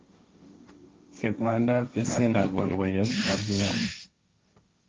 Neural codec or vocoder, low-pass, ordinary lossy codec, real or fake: codec, 16 kHz, 1.1 kbps, Voila-Tokenizer; 7.2 kHz; Opus, 32 kbps; fake